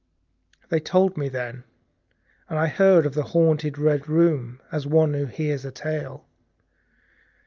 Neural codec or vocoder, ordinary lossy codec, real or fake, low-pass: none; Opus, 24 kbps; real; 7.2 kHz